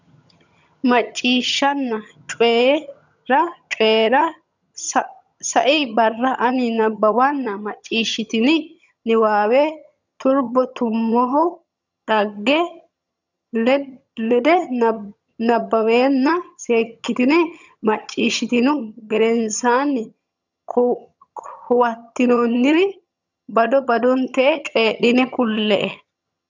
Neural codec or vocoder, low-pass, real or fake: vocoder, 22.05 kHz, 80 mel bands, HiFi-GAN; 7.2 kHz; fake